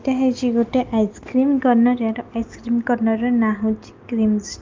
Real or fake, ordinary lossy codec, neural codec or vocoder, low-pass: real; Opus, 32 kbps; none; 7.2 kHz